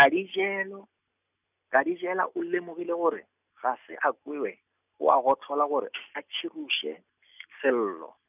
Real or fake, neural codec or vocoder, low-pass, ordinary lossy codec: real; none; 3.6 kHz; none